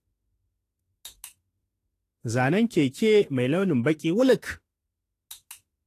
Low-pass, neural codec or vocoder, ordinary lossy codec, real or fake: 14.4 kHz; autoencoder, 48 kHz, 32 numbers a frame, DAC-VAE, trained on Japanese speech; AAC, 48 kbps; fake